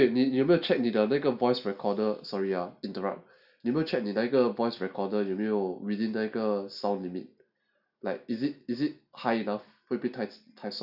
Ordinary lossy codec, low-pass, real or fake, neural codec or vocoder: none; 5.4 kHz; real; none